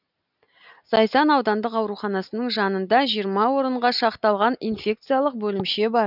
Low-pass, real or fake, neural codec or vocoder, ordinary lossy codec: 5.4 kHz; real; none; none